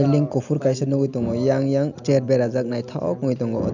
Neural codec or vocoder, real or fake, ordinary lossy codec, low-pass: none; real; none; 7.2 kHz